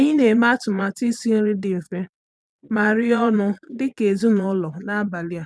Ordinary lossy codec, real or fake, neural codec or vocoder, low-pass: none; fake; vocoder, 22.05 kHz, 80 mel bands, Vocos; none